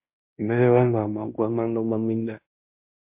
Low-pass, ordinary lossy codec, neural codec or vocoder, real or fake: 3.6 kHz; MP3, 32 kbps; codec, 16 kHz in and 24 kHz out, 0.9 kbps, LongCat-Audio-Codec, fine tuned four codebook decoder; fake